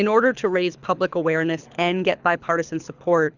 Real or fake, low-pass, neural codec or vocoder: fake; 7.2 kHz; codec, 24 kHz, 6 kbps, HILCodec